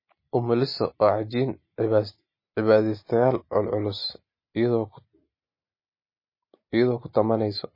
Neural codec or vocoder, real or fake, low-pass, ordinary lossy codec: none; real; 5.4 kHz; MP3, 24 kbps